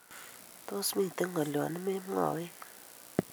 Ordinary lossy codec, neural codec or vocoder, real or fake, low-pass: none; none; real; none